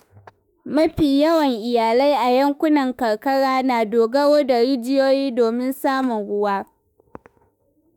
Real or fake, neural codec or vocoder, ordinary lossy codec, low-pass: fake; autoencoder, 48 kHz, 32 numbers a frame, DAC-VAE, trained on Japanese speech; none; none